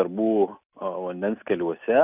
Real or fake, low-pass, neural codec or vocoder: real; 3.6 kHz; none